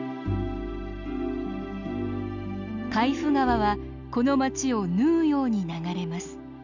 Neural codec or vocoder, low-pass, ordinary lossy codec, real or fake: none; 7.2 kHz; none; real